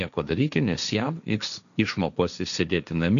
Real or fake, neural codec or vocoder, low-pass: fake; codec, 16 kHz, 1.1 kbps, Voila-Tokenizer; 7.2 kHz